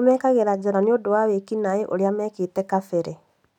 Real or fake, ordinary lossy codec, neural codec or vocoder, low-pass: real; none; none; 19.8 kHz